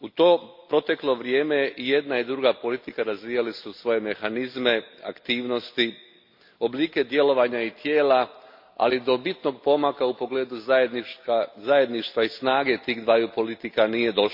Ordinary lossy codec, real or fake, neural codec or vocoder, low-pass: MP3, 48 kbps; real; none; 5.4 kHz